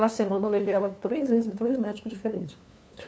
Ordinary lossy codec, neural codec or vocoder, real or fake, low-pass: none; codec, 16 kHz, 2 kbps, FunCodec, trained on LibriTTS, 25 frames a second; fake; none